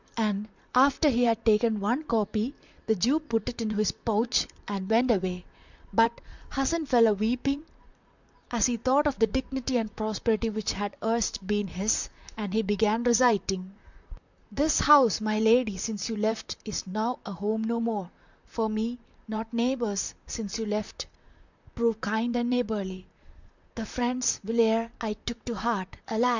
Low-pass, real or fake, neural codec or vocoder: 7.2 kHz; fake; vocoder, 44.1 kHz, 128 mel bands, Pupu-Vocoder